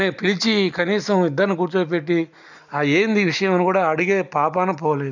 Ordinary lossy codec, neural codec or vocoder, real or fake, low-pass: none; none; real; 7.2 kHz